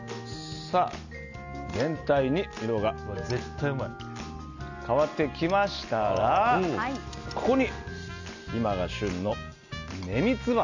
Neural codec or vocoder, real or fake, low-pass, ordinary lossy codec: none; real; 7.2 kHz; none